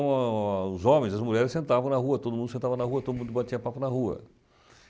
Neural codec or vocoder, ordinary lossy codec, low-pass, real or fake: none; none; none; real